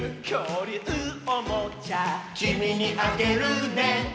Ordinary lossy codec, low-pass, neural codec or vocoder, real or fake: none; none; none; real